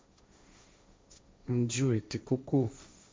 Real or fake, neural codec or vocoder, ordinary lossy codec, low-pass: fake; codec, 16 kHz, 1.1 kbps, Voila-Tokenizer; none; none